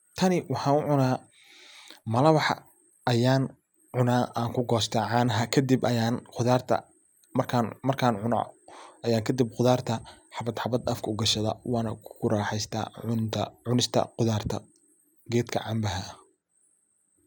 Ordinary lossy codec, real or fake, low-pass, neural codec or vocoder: none; real; none; none